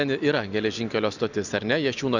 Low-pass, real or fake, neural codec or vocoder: 7.2 kHz; real; none